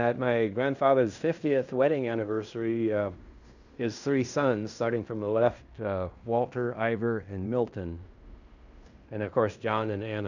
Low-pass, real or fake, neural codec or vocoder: 7.2 kHz; fake; codec, 16 kHz in and 24 kHz out, 0.9 kbps, LongCat-Audio-Codec, fine tuned four codebook decoder